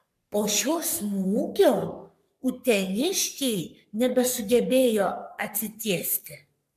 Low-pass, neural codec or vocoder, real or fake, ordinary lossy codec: 14.4 kHz; codec, 44.1 kHz, 3.4 kbps, Pupu-Codec; fake; MP3, 96 kbps